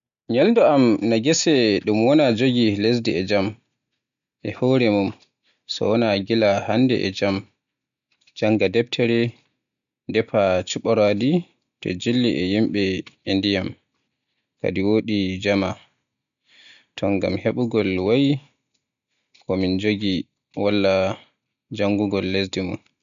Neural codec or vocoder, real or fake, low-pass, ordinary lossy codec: none; real; 7.2 kHz; none